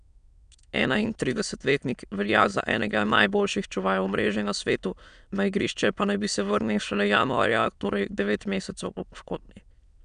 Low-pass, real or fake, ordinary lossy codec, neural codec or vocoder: 9.9 kHz; fake; none; autoencoder, 22.05 kHz, a latent of 192 numbers a frame, VITS, trained on many speakers